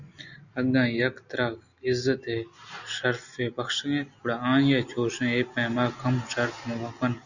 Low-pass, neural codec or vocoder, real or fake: 7.2 kHz; none; real